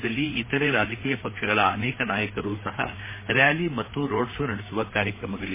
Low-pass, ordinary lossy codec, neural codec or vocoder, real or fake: 3.6 kHz; MP3, 16 kbps; codec, 16 kHz, 4 kbps, FreqCodec, larger model; fake